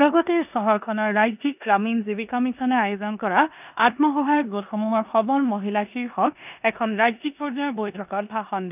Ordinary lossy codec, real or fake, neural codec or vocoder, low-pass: none; fake; codec, 16 kHz in and 24 kHz out, 0.9 kbps, LongCat-Audio-Codec, four codebook decoder; 3.6 kHz